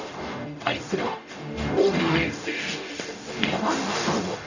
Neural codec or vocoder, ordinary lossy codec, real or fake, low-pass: codec, 44.1 kHz, 0.9 kbps, DAC; none; fake; 7.2 kHz